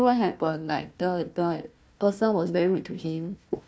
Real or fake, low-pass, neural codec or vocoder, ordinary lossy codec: fake; none; codec, 16 kHz, 1 kbps, FunCodec, trained on Chinese and English, 50 frames a second; none